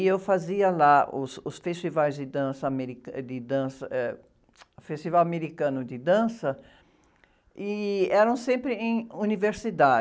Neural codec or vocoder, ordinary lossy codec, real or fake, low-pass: none; none; real; none